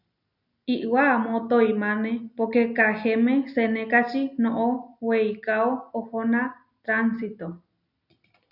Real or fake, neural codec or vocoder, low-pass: real; none; 5.4 kHz